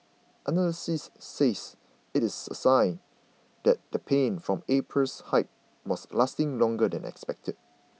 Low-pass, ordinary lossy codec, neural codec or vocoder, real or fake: none; none; none; real